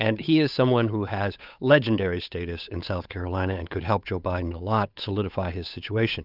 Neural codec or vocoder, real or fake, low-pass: codec, 16 kHz, 8 kbps, FunCodec, trained on Chinese and English, 25 frames a second; fake; 5.4 kHz